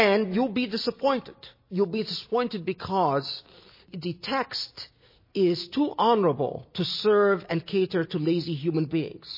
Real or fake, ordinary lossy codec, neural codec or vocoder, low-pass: real; MP3, 24 kbps; none; 5.4 kHz